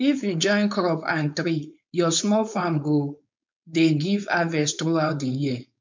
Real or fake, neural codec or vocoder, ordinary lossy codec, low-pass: fake; codec, 16 kHz, 4.8 kbps, FACodec; MP3, 64 kbps; 7.2 kHz